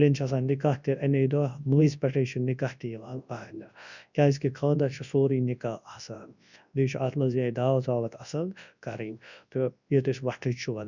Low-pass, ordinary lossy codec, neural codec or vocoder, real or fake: 7.2 kHz; none; codec, 24 kHz, 0.9 kbps, WavTokenizer, large speech release; fake